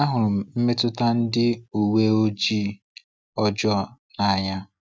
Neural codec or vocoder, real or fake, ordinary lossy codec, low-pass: none; real; none; none